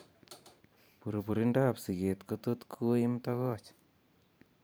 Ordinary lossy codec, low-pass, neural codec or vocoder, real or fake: none; none; vocoder, 44.1 kHz, 128 mel bands every 512 samples, BigVGAN v2; fake